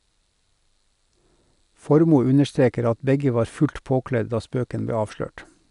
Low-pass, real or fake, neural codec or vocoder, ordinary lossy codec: 10.8 kHz; real; none; none